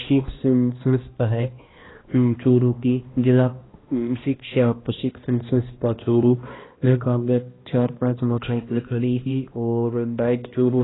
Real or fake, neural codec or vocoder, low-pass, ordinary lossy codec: fake; codec, 16 kHz, 1 kbps, X-Codec, HuBERT features, trained on balanced general audio; 7.2 kHz; AAC, 16 kbps